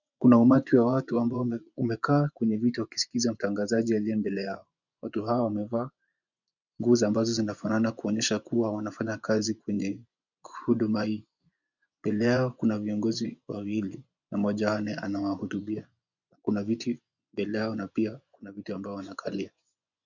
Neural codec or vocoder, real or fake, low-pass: vocoder, 24 kHz, 100 mel bands, Vocos; fake; 7.2 kHz